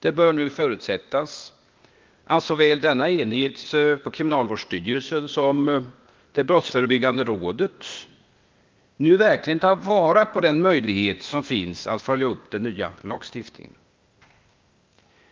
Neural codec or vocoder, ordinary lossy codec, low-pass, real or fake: codec, 16 kHz, 0.8 kbps, ZipCodec; Opus, 24 kbps; 7.2 kHz; fake